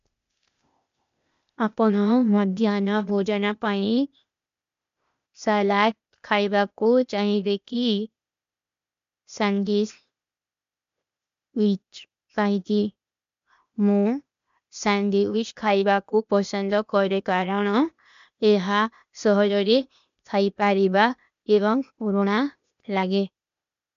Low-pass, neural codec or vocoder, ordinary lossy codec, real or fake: 7.2 kHz; codec, 16 kHz, 0.8 kbps, ZipCodec; MP3, 64 kbps; fake